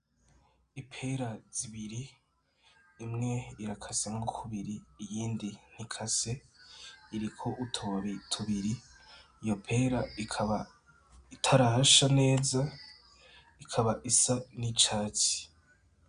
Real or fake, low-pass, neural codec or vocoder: real; 9.9 kHz; none